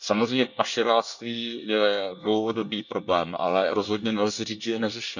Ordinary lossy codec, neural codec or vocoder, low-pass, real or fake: none; codec, 24 kHz, 1 kbps, SNAC; 7.2 kHz; fake